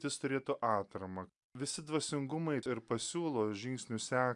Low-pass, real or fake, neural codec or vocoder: 10.8 kHz; real; none